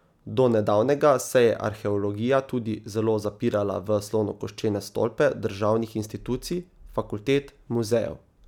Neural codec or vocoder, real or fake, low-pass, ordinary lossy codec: none; real; 19.8 kHz; none